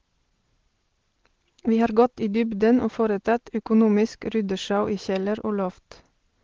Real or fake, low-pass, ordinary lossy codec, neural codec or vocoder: real; 7.2 kHz; Opus, 16 kbps; none